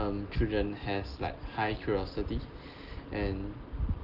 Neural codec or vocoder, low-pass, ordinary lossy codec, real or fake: none; 5.4 kHz; Opus, 16 kbps; real